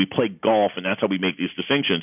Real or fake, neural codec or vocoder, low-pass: real; none; 3.6 kHz